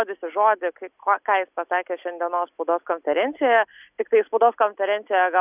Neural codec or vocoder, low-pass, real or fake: none; 3.6 kHz; real